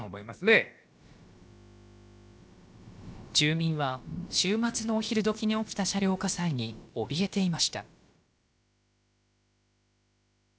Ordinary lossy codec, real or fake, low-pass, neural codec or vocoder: none; fake; none; codec, 16 kHz, about 1 kbps, DyCAST, with the encoder's durations